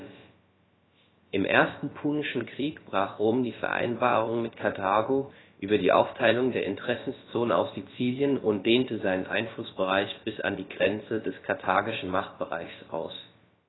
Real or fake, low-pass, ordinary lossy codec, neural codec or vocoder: fake; 7.2 kHz; AAC, 16 kbps; codec, 16 kHz, about 1 kbps, DyCAST, with the encoder's durations